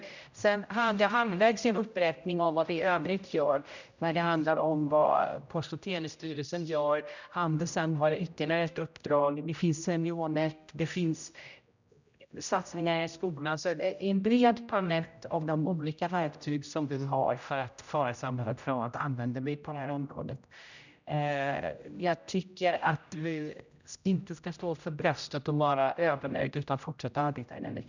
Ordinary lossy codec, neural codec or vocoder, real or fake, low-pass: none; codec, 16 kHz, 0.5 kbps, X-Codec, HuBERT features, trained on general audio; fake; 7.2 kHz